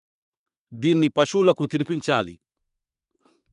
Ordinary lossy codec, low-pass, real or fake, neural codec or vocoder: none; 10.8 kHz; fake; codec, 24 kHz, 1 kbps, SNAC